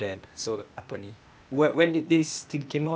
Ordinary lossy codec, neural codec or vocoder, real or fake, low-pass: none; codec, 16 kHz, 0.8 kbps, ZipCodec; fake; none